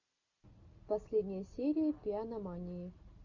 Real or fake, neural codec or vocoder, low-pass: real; none; 7.2 kHz